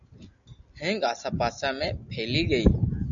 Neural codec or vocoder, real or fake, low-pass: none; real; 7.2 kHz